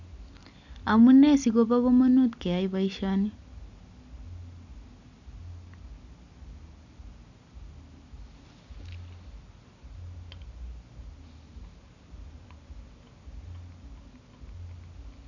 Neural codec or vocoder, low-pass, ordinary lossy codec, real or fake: none; 7.2 kHz; none; real